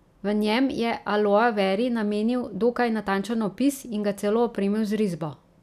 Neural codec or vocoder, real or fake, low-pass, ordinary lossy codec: none; real; 14.4 kHz; none